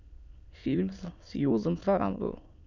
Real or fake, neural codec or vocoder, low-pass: fake; autoencoder, 22.05 kHz, a latent of 192 numbers a frame, VITS, trained on many speakers; 7.2 kHz